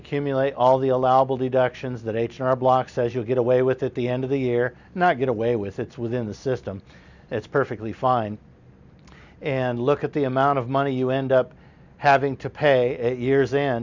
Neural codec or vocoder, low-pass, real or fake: none; 7.2 kHz; real